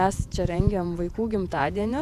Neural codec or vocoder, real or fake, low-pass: vocoder, 48 kHz, 128 mel bands, Vocos; fake; 14.4 kHz